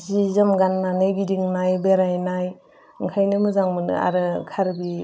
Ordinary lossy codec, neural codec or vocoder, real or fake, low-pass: none; none; real; none